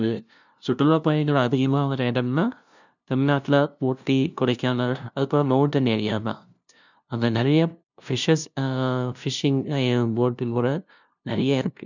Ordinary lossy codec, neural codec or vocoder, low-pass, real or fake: none; codec, 16 kHz, 0.5 kbps, FunCodec, trained on LibriTTS, 25 frames a second; 7.2 kHz; fake